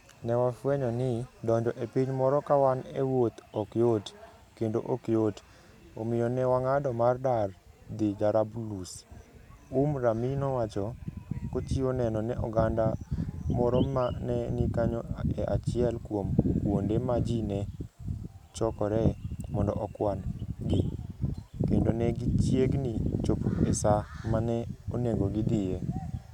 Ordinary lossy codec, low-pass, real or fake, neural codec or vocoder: none; 19.8 kHz; real; none